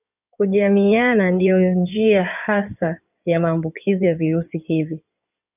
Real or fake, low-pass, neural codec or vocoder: fake; 3.6 kHz; codec, 16 kHz in and 24 kHz out, 2.2 kbps, FireRedTTS-2 codec